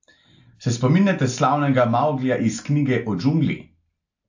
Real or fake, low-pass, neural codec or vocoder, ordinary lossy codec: real; 7.2 kHz; none; AAC, 48 kbps